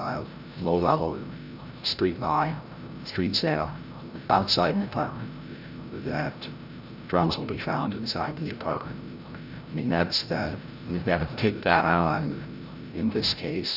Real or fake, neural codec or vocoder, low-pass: fake; codec, 16 kHz, 0.5 kbps, FreqCodec, larger model; 5.4 kHz